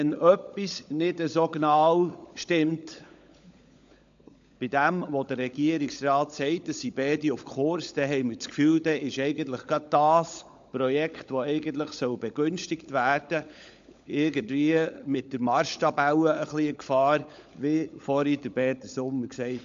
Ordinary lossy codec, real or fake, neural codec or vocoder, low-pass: AAC, 64 kbps; fake; codec, 16 kHz, 16 kbps, FunCodec, trained on LibriTTS, 50 frames a second; 7.2 kHz